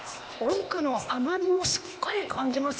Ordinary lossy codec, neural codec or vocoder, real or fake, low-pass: none; codec, 16 kHz, 0.8 kbps, ZipCodec; fake; none